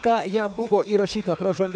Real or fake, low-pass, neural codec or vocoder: fake; 9.9 kHz; codec, 24 kHz, 1 kbps, SNAC